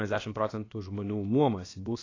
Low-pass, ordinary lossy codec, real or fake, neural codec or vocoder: 7.2 kHz; AAC, 32 kbps; fake; codec, 16 kHz, about 1 kbps, DyCAST, with the encoder's durations